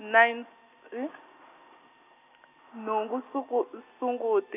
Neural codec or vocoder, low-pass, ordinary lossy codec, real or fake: none; 3.6 kHz; none; real